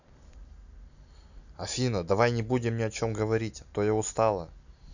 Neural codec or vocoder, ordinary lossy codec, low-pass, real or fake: none; none; 7.2 kHz; real